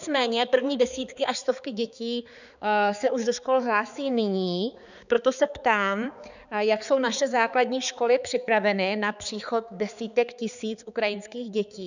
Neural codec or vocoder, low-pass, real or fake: codec, 16 kHz, 4 kbps, X-Codec, HuBERT features, trained on balanced general audio; 7.2 kHz; fake